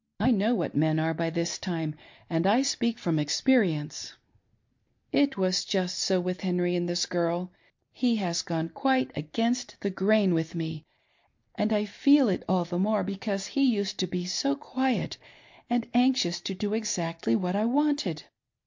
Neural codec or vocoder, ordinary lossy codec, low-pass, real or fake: none; MP3, 48 kbps; 7.2 kHz; real